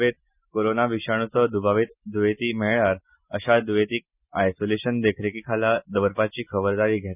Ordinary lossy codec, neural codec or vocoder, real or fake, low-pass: none; none; real; 3.6 kHz